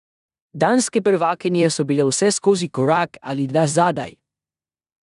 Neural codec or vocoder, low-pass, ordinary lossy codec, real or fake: codec, 16 kHz in and 24 kHz out, 0.9 kbps, LongCat-Audio-Codec, four codebook decoder; 10.8 kHz; none; fake